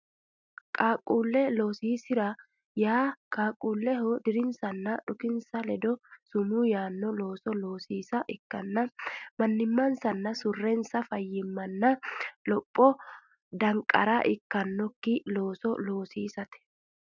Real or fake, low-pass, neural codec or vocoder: real; 7.2 kHz; none